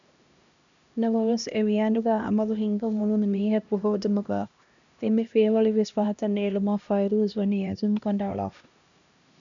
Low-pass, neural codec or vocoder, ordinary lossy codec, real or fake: 7.2 kHz; codec, 16 kHz, 1 kbps, X-Codec, HuBERT features, trained on LibriSpeech; MP3, 96 kbps; fake